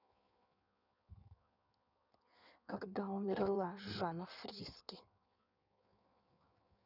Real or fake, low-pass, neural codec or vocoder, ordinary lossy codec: fake; 5.4 kHz; codec, 16 kHz in and 24 kHz out, 1.1 kbps, FireRedTTS-2 codec; none